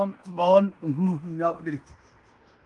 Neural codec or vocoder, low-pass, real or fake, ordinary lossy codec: codec, 16 kHz in and 24 kHz out, 0.9 kbps, LongCat-Audio-Codec, fine tuned four codebook decoder; 10.8 kHz; fake; Opus, 24 kbps